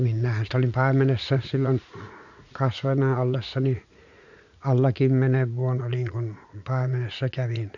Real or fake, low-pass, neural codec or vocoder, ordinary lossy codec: fake; 7.2 kHz; vocoder, 44.1 kHz, 80 mel bands, Vocos; none